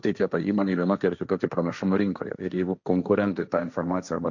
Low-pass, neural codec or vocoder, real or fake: 7.2 kHz; codec, 16 kHz, 1.1 kbps, Voila-Tokenizer; fake